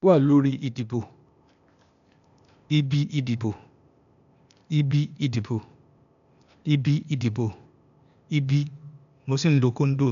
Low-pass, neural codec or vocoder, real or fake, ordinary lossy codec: 7.2 kHz; codec, 16 kHz, 0.8 kbps, ZipCodec; fake; none